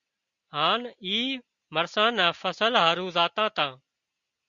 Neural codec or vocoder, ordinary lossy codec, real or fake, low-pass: none; Opus, 64 kbps; real; 7.2 kHz